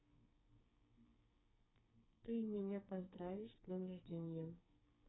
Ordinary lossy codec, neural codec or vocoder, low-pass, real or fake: AAC, 16 kbps; codec, 44.1 kHz, 2.6 kbps, SNAC; 7.2 kHz; fake